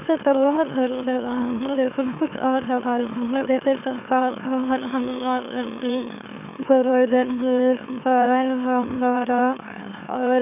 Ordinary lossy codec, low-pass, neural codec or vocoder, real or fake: none; 3.6 kHz; autoencoder, 44.1 kHz, a latent of 192 numbers a frame, MeloTTS; fake